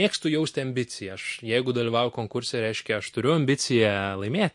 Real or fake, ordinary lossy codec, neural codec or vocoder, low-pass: real; MP3, 48 kbps; none; 10.8 kHz